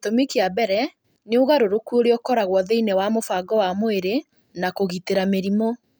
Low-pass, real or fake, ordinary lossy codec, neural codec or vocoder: none; real; none; none